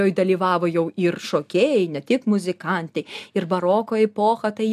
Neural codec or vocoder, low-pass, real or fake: none; 14.4 kHz; real